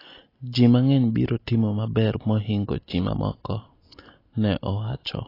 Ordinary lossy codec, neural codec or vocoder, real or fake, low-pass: AAC, 24 kbps; none; real; 5.4 kHz